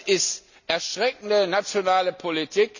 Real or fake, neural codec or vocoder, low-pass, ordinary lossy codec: real; none; 7.2 kHz; none